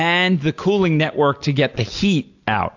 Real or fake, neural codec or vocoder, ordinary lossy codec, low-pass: real; none; AAC, 48 kbps; 7.2 kHz